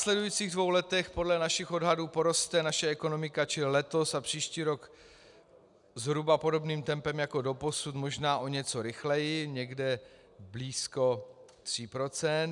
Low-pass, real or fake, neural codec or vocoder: 10.8 kHz; real; none